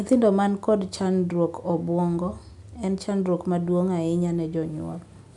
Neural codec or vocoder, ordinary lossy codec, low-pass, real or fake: none; none; 10.8 kHz; real